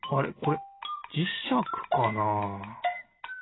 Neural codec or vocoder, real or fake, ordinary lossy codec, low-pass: none; real; AAC, 16 kbps; 7.2 kHz